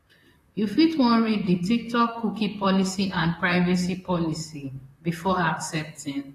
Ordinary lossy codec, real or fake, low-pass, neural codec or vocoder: AAC, 48 kbps; fake; 14.4 kHz; vocoder, 44.1 kHz, 128 mel bands, Pupu-Vocoder